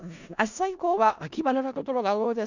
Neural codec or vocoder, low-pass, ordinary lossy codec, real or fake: codec, 16 kHz in and 24 kHz out, 0.4 kbps, LongCat-Audio-Codec, four codebook decoder; 7.2 kHz; none; fake